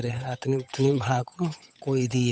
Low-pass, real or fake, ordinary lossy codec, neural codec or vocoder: none; fake; none; codec, 16 kHz, 8 kbps, FunCodec, trained on Chinese and English, 25 frames a second